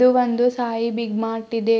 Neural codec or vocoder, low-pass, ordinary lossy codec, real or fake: none; none; none; real